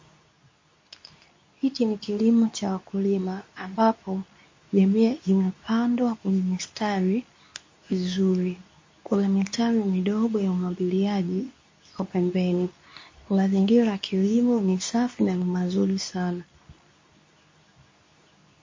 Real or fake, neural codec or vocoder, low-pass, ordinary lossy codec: fake; codec, 24 kHz, 0.9 kbps, WavTokenizer, medium speech release version 2; 7.2 kHz; MP3, 32 kbps